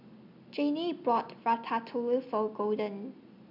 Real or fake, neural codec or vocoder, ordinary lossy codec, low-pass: real; none; none; 5.4 kHz